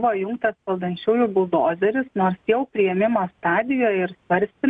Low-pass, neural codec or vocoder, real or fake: 9.9 kHz; none; real